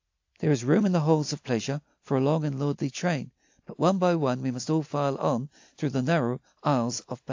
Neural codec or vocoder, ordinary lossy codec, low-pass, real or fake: none; AAC, 48 kbps; 7.2 kHz; real